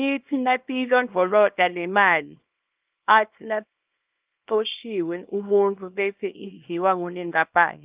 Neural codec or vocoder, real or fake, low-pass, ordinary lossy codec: codec, 24 kHz, 0.9 kbps, WavTokenizer, small release; fake; 3.6 kHz; Opus, 24 kbps